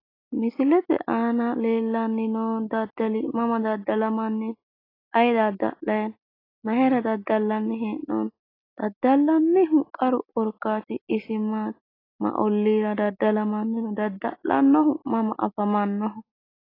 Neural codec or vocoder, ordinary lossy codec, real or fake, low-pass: none; AAC, 24 kbps; real; 5.4 kHz